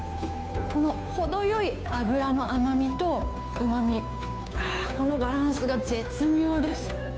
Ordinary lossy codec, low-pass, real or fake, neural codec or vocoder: none; none; fake; codec, 16 kHz, 2 kbps, FunCodec, trained on Chinese and English, 25 frames a second